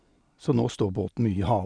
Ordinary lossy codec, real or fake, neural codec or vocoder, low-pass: none; real; none; 9.9 kHz